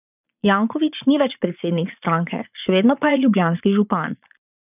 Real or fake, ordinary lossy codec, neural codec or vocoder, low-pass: fake; none; vocoder, 22.05 kHz, 80 mel bands, Vocos; 3.6 kHz